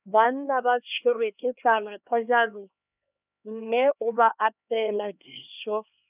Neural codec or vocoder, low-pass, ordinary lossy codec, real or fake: codec, 16 kHz, 2 kbps, X-Codec, HuBERT features, trained on LibriSpeech; 3.6 kHz; none; fake